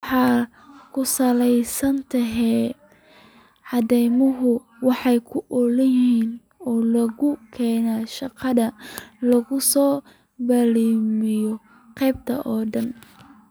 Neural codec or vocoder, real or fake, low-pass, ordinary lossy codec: none; real; none; none